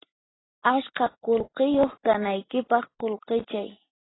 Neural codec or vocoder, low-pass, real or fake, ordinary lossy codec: none; 7.2 kHz; real; AAC, 16 kbps